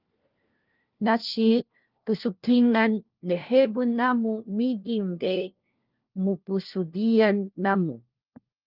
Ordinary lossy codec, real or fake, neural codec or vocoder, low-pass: Opus, 32 kbps; fake; codec, 16 kHz, 1 kbps, FunCodec, trained on LibriTTS, 50 frames a second; 5.4 kHz